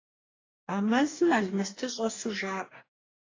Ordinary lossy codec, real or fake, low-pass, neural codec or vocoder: AAC, 32 kbps; fake; 7.2 kHz; codec, 44.1 kHz, 2.6 kbps, DAC